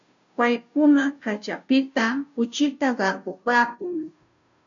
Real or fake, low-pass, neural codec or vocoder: fake; 7.2 kHz; codec, 16 kHz, 0.5 kbps, FunCodec, trained on Chinese and English, 25 frames a second